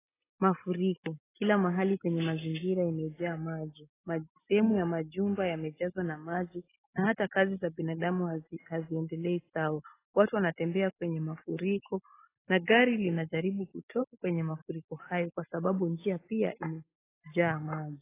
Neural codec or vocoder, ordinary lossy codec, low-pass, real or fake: none; AAC, 16 kbps; 3.6 kHz; real